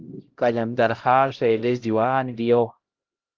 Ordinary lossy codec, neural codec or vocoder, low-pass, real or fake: Opus, 16 kbps; codec, 16 kHz, 0.5 kbps, X-Codec, HuBERT features, trained on LibriSpeech; 7.2 kHz; fake